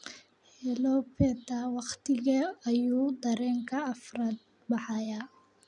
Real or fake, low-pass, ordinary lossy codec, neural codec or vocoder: real; 10.8 kHz; none; none